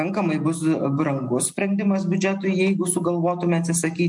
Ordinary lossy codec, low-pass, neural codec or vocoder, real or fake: MP3, 64 kbps; 10.8 kHz; none; real